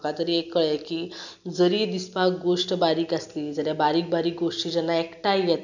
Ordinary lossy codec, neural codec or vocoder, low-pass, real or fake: none; none; 7.2 kHz; real